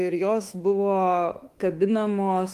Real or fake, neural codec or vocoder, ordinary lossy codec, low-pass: fake; autoencoder, 48 kHz, 32 numbers a frame, DAC-VAE, trained on Japanese speech; Opus, 24 kbps; 14.4 kHz